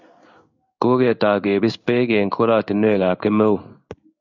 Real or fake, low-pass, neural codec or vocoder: fake; 7.2 kHz; codec, 16 kHz in and 24 kHz out, 1 kbps, XY-Tokenizer